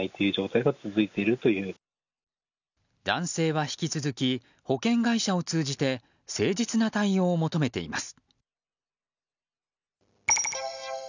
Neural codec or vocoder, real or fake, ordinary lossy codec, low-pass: none; real; none; 7.2 kHz